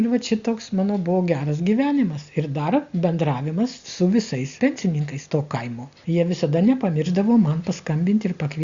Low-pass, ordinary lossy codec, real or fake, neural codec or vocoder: 7.2 kHz; Opus, 64 kbps; real; none